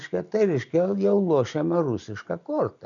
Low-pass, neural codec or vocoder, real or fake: 7.2 kHz; none; real